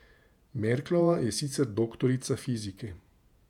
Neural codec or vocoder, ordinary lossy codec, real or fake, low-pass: vocoder, 48 kHz, 128 mel bands, Vocos; none; fake; 19.8 kHz